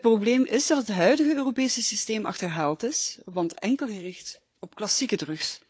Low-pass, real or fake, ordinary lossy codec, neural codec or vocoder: none; fake; none; codec, 16 kHz, 4 kbps, FunCodec, trained on Chinese and English, 50 frames a second